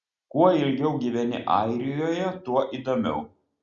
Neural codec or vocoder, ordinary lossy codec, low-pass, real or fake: none; Opus, 64 kbps; 7.2 kHz; real